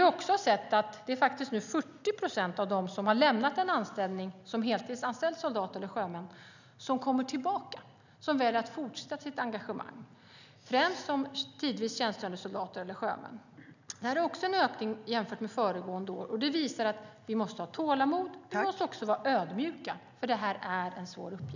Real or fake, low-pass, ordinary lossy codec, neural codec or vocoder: real; 7.2 kHz; none; none